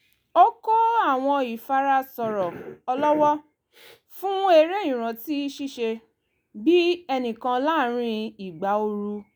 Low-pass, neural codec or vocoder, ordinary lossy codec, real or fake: none; none; none; real